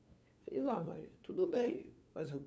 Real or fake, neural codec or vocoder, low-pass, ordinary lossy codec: fake; codec, 16 kHz, 2 kbps, FunCodec, trained on LibriTTS, 25 frames a second; none; none